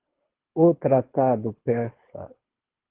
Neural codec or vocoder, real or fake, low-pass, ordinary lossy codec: codec, 24 kHz, 6 kbps, HILCodec; fake; 3.6 kHz; Opus, 16 kbps